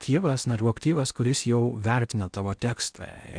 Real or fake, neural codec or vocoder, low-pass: fake; codec, 16 kHz in and 24 kHz out, 0.8 kbps, FocalCodec, streaming, 65536 codes; 9.9 kHz